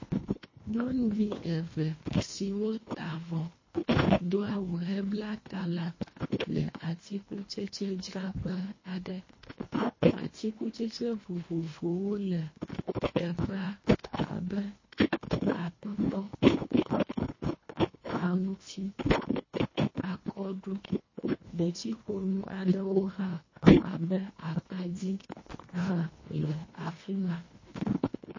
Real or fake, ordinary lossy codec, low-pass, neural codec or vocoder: fake; MP3, 32 kbps; 7.2 kHz; codec, 24 kHz, 1.5 kbps, HILCodec